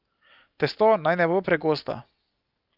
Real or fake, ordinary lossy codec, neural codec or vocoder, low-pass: real; Opus, 24 kbps; none; 5.4 kHz